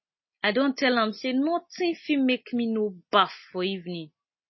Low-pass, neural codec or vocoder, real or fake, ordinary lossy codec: 7.2 kHz; none; real; MP3, 24 kbps